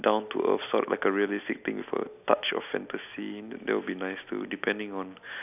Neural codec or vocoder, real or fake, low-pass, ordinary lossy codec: none; real; 3.6 kHz; none